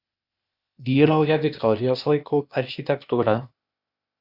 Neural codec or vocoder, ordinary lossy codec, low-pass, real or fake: codec, 16 kHz, 0.8 kbps, ZipCodec; Opus, 64 kbps; 5.4 kHz; fake